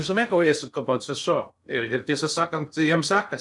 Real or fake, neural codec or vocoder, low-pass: fake; codec, 16 kHz in and 24 kHz out, 0.6 kbps, FocalCodec, streaming, 2048 codes; 10.8 kHz